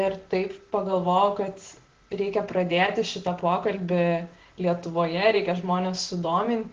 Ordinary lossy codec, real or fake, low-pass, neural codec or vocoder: Opus, 16 kbps; real; 7.2 kHz; none